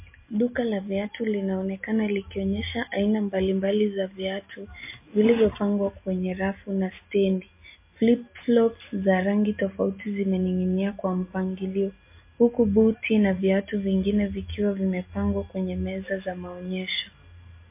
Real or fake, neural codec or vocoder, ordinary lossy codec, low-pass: real; none; MP3, 24 kbps; 3.6 kHz